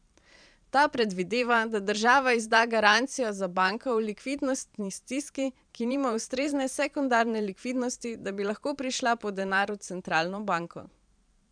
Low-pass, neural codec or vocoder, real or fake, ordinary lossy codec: 9.9 kHz; vocoder, 44.1 kHz, 128 mel bands every 512 samples, BigVGAN v2; fake; none